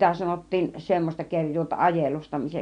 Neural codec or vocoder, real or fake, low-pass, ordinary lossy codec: none; real; 9.9 kHz; MP3, 64 kbps